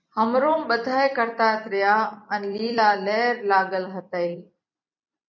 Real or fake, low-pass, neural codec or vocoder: fake; 7.2 kHz; vocoder, 44.1 kHz, 128 mel bands every 256 samples, BigVGAN v2